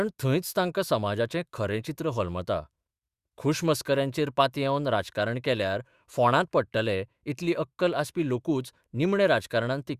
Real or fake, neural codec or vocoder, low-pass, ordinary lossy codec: fake; autoencoder, 48 kHz, 128 numbers a frame, DAC-VAE, trained on Japanese speech; 14.4 kHz; Opus, 64 kbps